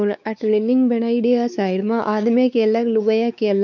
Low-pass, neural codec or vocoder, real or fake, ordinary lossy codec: 7.2 kHz; codec, 16 kHz, 4 kbps, X-Codec, WavLM features, trained on Multilingual LibriSpeech; fake; none